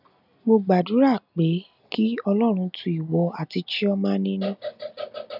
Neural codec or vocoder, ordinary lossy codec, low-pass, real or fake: none; none; 5.4 kHz; real